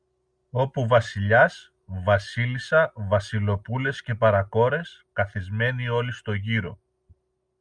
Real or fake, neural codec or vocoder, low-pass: real; none; 9.9 kHz